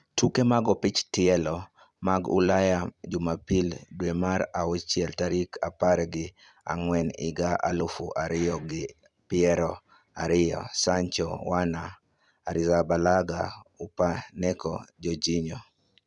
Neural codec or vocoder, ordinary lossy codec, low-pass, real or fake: vocoder, 44.1 kHz, 128 mel bands every 256 samples, BigVGAN v2; none; 10.8 kHz; fake